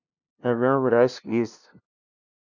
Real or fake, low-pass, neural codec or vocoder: fake; 7.2 kHz; codec, 16 kHz, 0.5 kbps, FunCodec, trained on LibriTTS, 25 frames a second